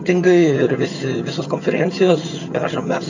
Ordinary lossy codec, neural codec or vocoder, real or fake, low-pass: AAC, 48 kbps; vocoder, 22.05 kHz, 80 mel bands, HiFi-GAN; fake; 7.2 kHz